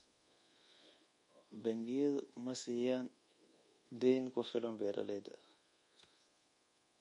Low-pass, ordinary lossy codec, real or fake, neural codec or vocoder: 10.8 kHz; MP3, 48 kbps; fake; codec, 24 kHz, 1.2 kbps, DualCodec